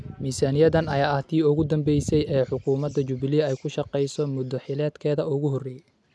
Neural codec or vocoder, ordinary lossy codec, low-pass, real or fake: none; none; none; real